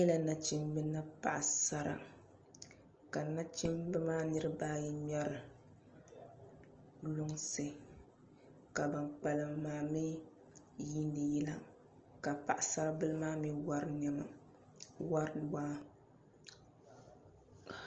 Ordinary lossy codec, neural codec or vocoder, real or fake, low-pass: Opus, 24 kbps; none; real; 7.2 kHz